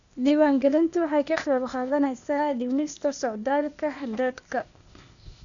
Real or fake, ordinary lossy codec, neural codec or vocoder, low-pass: fake; none; codec, 16 kHz, 0.8 kbps, ZipCodec; 7.2 kHz